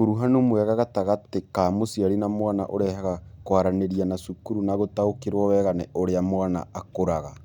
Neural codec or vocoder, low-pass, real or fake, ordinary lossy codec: none; 19.8 kHz; real; none